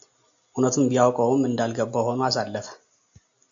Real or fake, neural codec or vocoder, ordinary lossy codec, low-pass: real; none; AAC, 64 kbps; 7.2 kHz